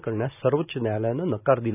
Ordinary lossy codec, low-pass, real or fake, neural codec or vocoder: none; 3.6 kHz; real; none